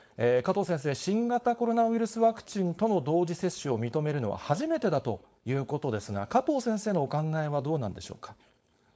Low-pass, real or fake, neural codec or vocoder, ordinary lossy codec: none; fake; codec, 16 kHz, 4.8 kbps, FACodec; none